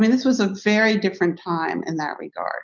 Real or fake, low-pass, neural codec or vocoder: real; 7.2 kHz; none